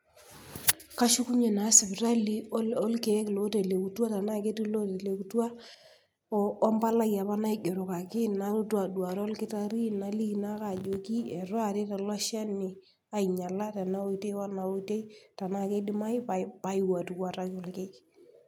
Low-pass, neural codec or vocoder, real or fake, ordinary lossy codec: none; none; real; none